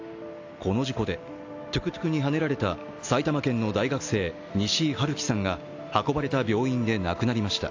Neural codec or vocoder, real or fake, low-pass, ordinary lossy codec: none; real; 7.2 kHz; AAC, 48 kbps